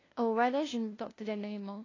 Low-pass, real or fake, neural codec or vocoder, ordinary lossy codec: 7.2 kHz; fake; codec, 16 kHz, 0.5 kbps, FunCodec, trained on LibriTTS, 25 frames a second; AAC, 32 kbps